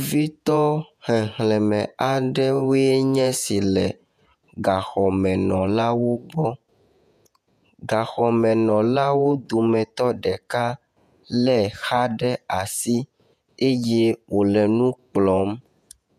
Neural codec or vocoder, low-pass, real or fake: vocoder, 48 kHz, 128 mel bands, Vocos; 14.4 kHz; fake